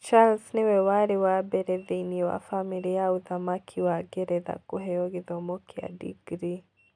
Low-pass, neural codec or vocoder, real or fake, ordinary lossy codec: 9.9 kHz; none; real; none